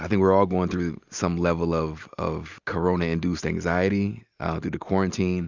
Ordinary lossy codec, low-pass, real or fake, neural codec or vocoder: Opus, 64 kbps; 7.2 kHz; real; none